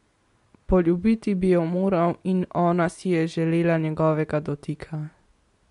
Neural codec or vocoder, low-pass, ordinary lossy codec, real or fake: none; 10.8 kHz; MP3, 64 kbps; real